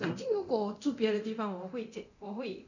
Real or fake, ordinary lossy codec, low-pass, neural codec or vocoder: fake; none; 7.2 kHz; codec, 24 kHz, 0.9 kbps, DualCodec